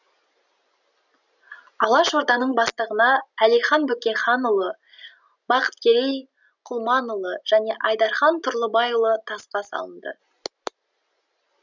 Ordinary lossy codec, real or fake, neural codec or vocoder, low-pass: none; real; none; 7.2 kHz